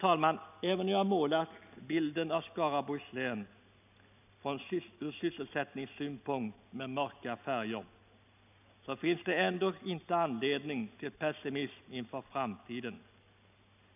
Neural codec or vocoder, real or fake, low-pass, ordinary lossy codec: none; real; 3.6 kHz; none